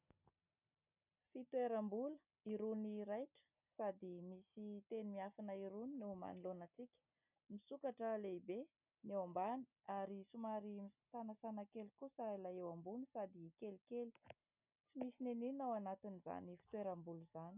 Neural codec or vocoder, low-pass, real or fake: none; 3.6 kHz; real